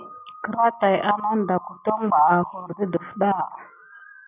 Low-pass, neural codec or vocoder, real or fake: 3.6 kHz; none; real